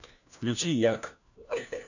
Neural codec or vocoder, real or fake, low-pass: codec, 16 kHz, 1 kbps, FunCodec, trained on LibriTTS, 50 frames a second; fake; 7.2 kHz